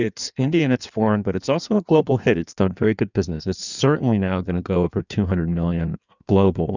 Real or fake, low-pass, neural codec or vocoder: fake; 7.2 kHz; codec, 16 kHz in and 24 kHz out, 1.1 kbps, FireRedTTS-2 codec